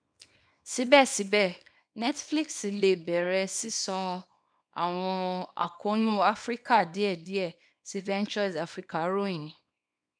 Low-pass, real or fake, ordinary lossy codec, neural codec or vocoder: 9.9 kHz; fake; none; codec, 24 kHz, 0.9 kbps, WavTokenizer, small release